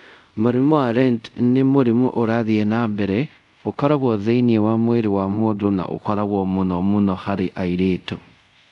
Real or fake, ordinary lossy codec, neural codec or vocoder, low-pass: fake; none; codec, 24 kHz, 0.5 kbps, DualCodec; 10.8 kHz